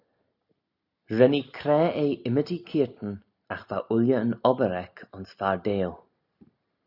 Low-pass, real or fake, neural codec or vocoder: 5.4 kHz; real; none